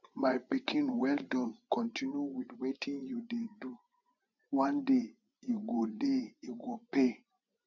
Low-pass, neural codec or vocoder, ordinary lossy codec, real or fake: 7.2 kHz; vocoder, 24 kHz, 100 mel bands, Vocos; none; fake